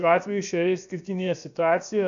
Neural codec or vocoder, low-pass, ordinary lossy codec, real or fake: codec, 16 kHz, about 1 kbps, DyCAST, with the encoder's durations; 7.2 kHz; MP3, 96 kbps; fake